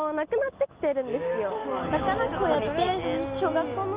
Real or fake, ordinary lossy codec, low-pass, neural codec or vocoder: real; Opus, 32 kbps; 3.6 kHz; none